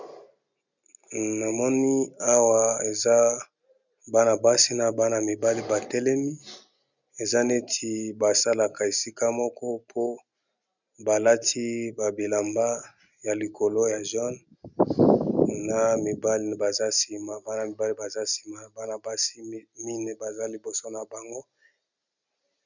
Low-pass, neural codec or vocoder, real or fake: 7.2 kHz; none; real